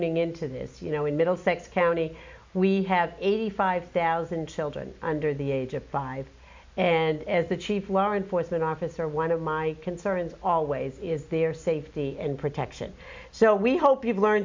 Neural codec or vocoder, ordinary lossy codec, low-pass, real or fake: none; MP3, 64 kbps; 7.2 kHz; real